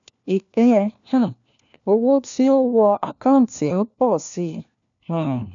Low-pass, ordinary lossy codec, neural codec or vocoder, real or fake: 7.2 kHz; MP3, 96 kbps; codec, 16 kHz, 1 kbps, FunCodec, trained on LibriTTS, 50 frames a second; fake